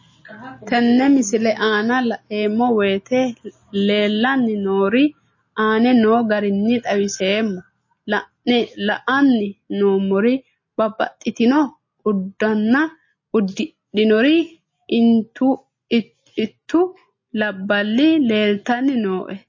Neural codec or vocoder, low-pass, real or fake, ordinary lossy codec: none; 7.2 kHz; real; MP3, 32 kbps